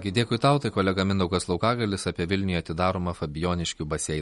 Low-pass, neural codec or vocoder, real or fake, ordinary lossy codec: 19.8 kHz; none; real; MP3, 48 kbps